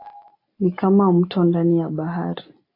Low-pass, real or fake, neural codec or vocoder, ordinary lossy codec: 5.4 kHz; real; none; AAC, 32 kbps